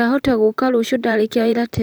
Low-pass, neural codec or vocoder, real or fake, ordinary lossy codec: none; vocoder, 44.1 kHz, 128 mel bands, Pupu-Vocoder; fake; none